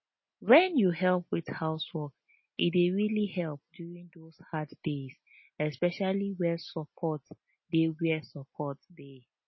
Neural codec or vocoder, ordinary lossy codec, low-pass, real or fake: none; MP3, 24 kbps; 7.2 kHz; real